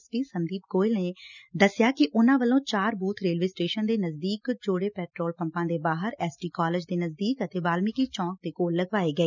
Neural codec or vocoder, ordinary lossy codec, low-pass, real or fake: none; none; 7.2 kHz; real